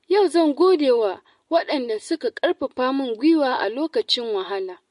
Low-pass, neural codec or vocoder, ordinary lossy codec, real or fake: 14.4 kHz; none; MP3, 48 kbps; real